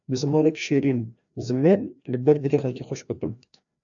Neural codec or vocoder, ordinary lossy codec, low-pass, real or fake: codec, 16 kHz, 1 kbps, FreqCodec, larger model; AAC, 64 kbps; 7.2 kHz; fake